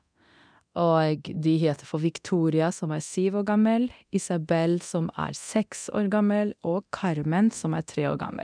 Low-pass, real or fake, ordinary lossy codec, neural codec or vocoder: 9.9 kHz; fake; none; codec, 24 kHz, 0.9 kbps, DualCodec